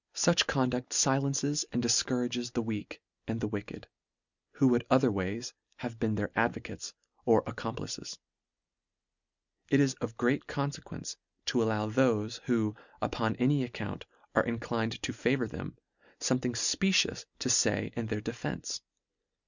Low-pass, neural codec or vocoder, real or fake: 7.2 kHz; none; real